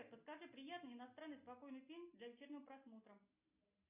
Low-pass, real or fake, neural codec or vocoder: 3.6 kHz; real; none